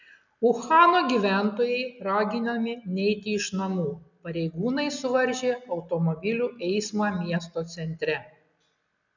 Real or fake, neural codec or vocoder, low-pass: real; none; 7.2 kHz